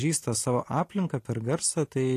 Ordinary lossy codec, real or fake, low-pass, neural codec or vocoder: AAC, 48 kbps; real; 14.4 kHz; none